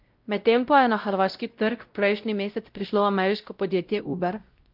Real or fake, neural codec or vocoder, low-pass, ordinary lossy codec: fake; codec, 16 kHz, 0.5 kbps, X-Codec, WavLM features, trained on Multilingual LibriSpeech; 5.4 kHz; Opus, 32 kbps